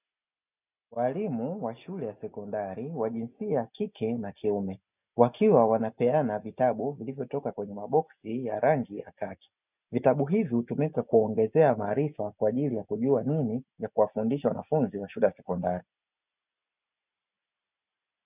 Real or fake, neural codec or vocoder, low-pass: real; none; 3.6 kHz